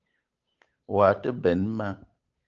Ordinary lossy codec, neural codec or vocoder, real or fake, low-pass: Opus, 24 kbps; codec, 16 kHz, 8 kbps, FunCodec, trained on Chinese and English, 25 frames a second; fake; 7.2 kHz